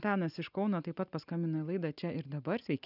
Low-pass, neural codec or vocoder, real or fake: 5.4 kHz; none; real